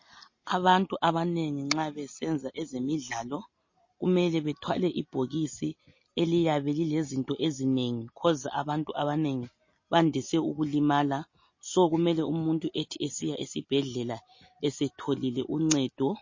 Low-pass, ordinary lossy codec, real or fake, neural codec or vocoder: 7.2 kHz; MP3, 32 kbps; real; none